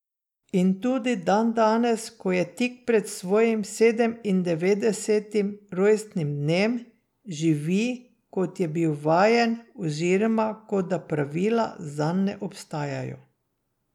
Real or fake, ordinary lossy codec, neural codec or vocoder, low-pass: real; none; none; 19.8 kHz